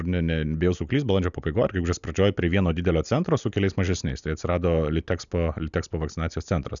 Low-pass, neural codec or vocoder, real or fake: 7.2 kHz; none; real